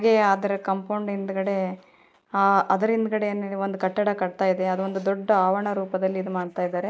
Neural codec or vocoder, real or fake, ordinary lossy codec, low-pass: none; real; none; none